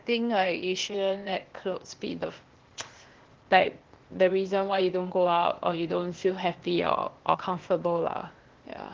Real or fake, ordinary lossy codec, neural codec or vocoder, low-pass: fake; Opus, 16 kbps; codec, 16 kHz, 0.8 kbps, ZipCodec; 7.2 kHz